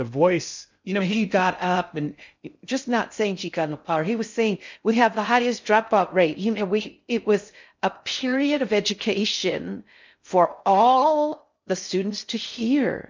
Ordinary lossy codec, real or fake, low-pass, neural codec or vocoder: MP3, 64 kbps; fake; 7.2 kHz; codec, 16 kHz in and 24 kHz out, 0.6 kbps, FocalCodec, streaming, 2048 codes